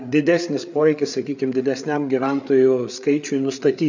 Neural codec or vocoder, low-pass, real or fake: codec, 16 kHz, 8 kbps, FreqCodec, larger model; 7.2 kHz; fake